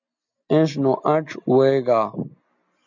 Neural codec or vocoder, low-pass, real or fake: none; 7.2 kHz; real